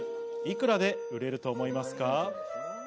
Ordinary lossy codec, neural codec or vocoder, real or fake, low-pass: none; none; real; none